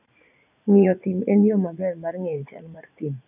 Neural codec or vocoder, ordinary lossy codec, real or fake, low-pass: vocoder, 44.1 kHz, 80 mel bands, Vocos; none; fake; 3.6 kHz